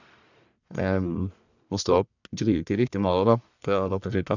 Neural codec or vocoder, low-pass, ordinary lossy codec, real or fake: codec, 44.1 kHz, 1.7 kbps, Pupu-Codec; 7.2 kHz; none; fake